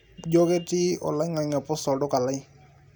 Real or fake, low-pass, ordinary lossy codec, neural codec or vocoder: real; none; none; none